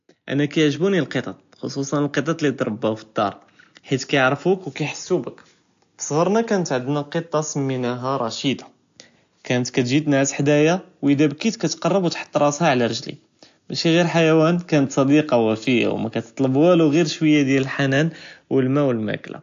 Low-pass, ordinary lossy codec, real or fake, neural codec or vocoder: 7.2 kHz; AAC, 64 kbps; real; none